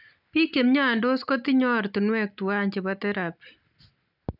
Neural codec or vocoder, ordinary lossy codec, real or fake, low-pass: none; none; real; 5.4 kHz